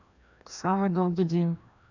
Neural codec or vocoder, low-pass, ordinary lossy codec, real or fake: codec, 16 kHz, 1 kbps, FreqCodec, larger model; 7.2 kHz; none; fake